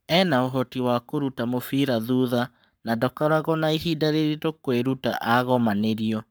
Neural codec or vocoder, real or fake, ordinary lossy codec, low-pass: codec, 44.1 kHz, 7.8 kbps, Pupu-Codec; fake; none; none